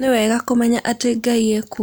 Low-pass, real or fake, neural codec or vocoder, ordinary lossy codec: none; real; none; none